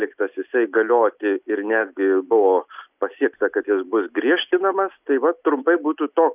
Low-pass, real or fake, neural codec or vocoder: 3.6 kHz; real; none